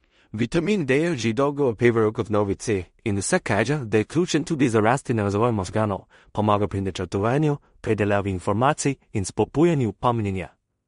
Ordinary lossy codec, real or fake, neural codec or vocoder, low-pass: MP3, 48 kbps; fake; codec, 16 kHz in and 24 kHz out, 0.4 kbps, LongCat-Audio-Codec, two codebook decoder; 10.8 kHz